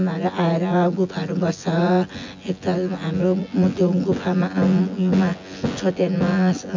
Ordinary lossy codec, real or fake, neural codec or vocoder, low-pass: MP3, 64 kbps; fake; vocoder, 24 kHz, 100 mel bands, Vocos; 7.2 kHz